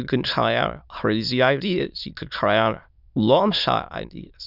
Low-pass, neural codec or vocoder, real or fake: 5.4 kHz; autoencoder, 22.05 kHz, a latent of 192 numbers a frame, VITS, trained on many speakers; fake